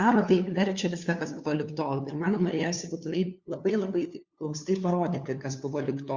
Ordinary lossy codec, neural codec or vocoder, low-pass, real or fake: Opus, 64 kbps; codec, 16 kHz, 2 kbps, FunCodec, trained on LibriTTS, 25 frames a second; 7.2 kHz; fake